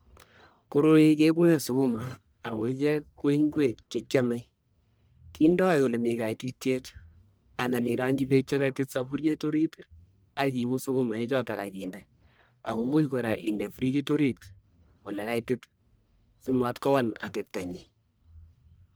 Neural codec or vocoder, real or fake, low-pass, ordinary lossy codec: codec, 44.1 kHz, 1.7 kbps, Pupu-Codec; fake; none; none